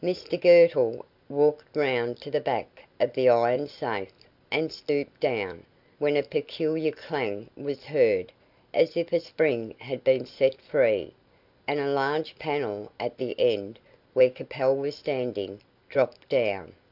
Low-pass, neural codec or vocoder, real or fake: 5.4 kHz; none; real